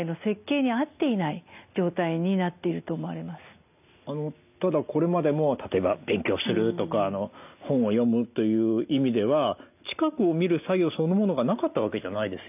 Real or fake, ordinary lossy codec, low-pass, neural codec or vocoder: real; none; 3.6 kHz; none